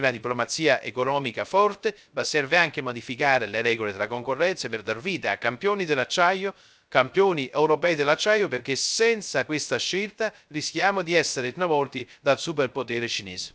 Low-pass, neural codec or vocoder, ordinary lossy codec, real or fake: none; codec, 16 kHz, 0.3 kbps, FocalCodec; none; fake